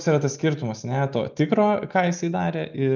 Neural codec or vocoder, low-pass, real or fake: none; 7.2 kHz; real